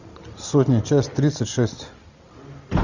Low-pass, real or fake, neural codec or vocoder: 7.2 kHz; real; none